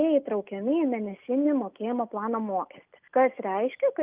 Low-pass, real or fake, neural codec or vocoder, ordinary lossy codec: 3.6 kHz; real; none; Opus, 24 kbps